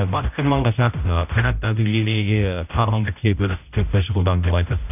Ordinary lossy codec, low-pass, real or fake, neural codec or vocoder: none; 3.6 kHz; fake; codec, 16 kHz, 0.5 kbps, X-Codec, HuBERT features, trained on general audio